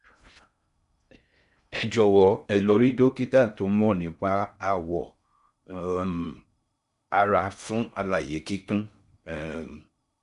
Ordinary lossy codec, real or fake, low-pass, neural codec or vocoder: none; fake; 10.8 kHz; codec, 16 kHz in and 24 kHz out, 0.6 kbps, FocalCodec, streaming, 2048 codes